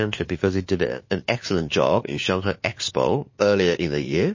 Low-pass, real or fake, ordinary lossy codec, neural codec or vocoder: 7.2 kHz; fake; MP3, 32 kbps; codec, 16 kHz, 2 kbps, FunCodec, trained on LibriTTS, 25 frames a second